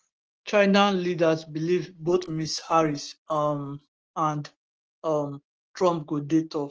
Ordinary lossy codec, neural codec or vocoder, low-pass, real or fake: Opus, 16 kbps; codec, 16 kHz, 6 kbps, DAC; 7.2 kHz; fake